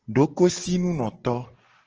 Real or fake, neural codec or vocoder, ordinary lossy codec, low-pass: real; none; Opus, 16 kbps; 7.2 kHz